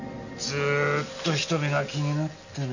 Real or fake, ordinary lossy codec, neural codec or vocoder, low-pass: real; none; none; 7.2 kHz